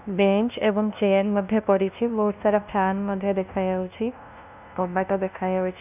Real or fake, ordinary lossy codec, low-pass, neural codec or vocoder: fake; none; 3.6 kHz; codec, 16 kHz, 0.5 kbps, FunCodec, trained on LibriTTS, 25 frames a second